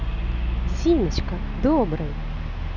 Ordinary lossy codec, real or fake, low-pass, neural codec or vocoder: none; real; 7.2 kHz; none